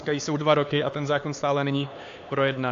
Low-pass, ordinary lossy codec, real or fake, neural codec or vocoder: 7.2 kHz; AAC, 48 kbps; fake; codec, 16 kHz, 4 kbps, X-Codec, HuBERT features, trained on LibriSpeech